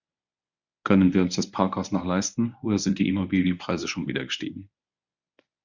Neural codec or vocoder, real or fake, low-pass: codec, 24 kHz, 0.9 kbps, WavTokenizer, medium speech release version 1; fake; 7.2 kHz